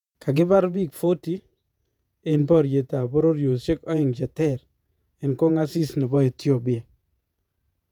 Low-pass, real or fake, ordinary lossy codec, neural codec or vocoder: 19.8 kHz; fake; none; vocoder, 48 kHz, 128 mel bands, Vocos